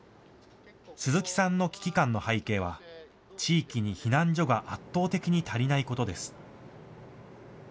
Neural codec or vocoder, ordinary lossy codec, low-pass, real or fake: none; none; none; real